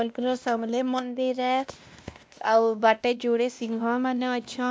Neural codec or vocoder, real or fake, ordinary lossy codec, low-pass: codec, 16 kHz, 1 kbps, X-Codec, WavLM features, trained on Multilingual LibriSpeech; fake; none; none